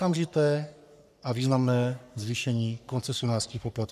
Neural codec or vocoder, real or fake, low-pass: codec, 44.1 kHz, 3.4 kbps, Pupu-Codec; fake; 14.4 kHz